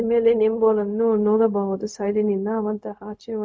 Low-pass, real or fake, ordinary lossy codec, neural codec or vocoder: none; fake; none; codec, 16 kHz, 0.4 kbps, LongCat-Audio-Codec